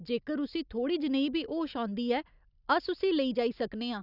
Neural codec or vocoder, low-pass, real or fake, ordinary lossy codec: none; 5.4 kHz; real; none